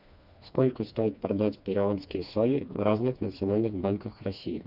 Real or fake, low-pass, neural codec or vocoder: fake; 5.4 kHz; codec, 16 kHz, 2 kbps, FreqCodec, smaller model